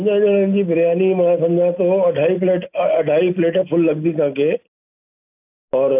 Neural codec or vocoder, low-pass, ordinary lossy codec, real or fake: none; 3.6 kHz; AAC, 32 kbps; real